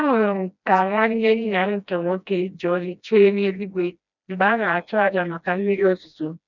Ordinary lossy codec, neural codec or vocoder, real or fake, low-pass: none; codec, 16 kHz, 1 kbps, FreqCodec, smaller model; fake; 7.2 kHz